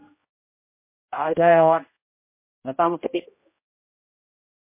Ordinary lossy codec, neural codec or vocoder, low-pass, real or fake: MP3, 24 kbps; codec, 16 kHz, 0.5 kbps, X-Codec, HuBERT features, trained on general audio; 3.6 kHz; fake